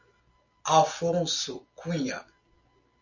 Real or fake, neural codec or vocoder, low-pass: real; none; 7.2 kHz